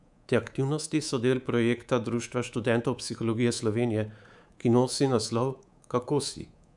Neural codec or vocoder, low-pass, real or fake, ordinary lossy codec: codec, 24 kHz, 3.1 kbps, DualCodec; 10.8 kHz; fake; none